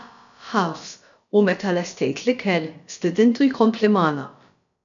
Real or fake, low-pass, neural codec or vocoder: fake; 7.2 kHz; codec, 16 kHz, about 1 kbps, DyCAST, with the encoder's durations